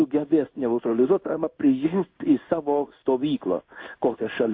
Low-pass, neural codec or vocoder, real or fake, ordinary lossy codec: 5.4 kHz; codec, 16 kHz in and 24 kHz out, 1 kbps, XY-Tokenizer; fake; MP3, 32 kbps